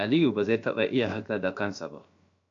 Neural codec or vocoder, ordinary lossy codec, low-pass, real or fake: codec, 16 kHz, about 1 kbps, DyCAST, with the encoder's durations; AAC, 64 kbps; 7.2 kHz; fake